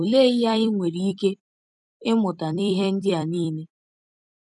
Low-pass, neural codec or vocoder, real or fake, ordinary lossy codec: 10.8 kHz; vocoder, 44.1 kHz, 128 mel bands every 256 samples, BigVGAN v2; fake; none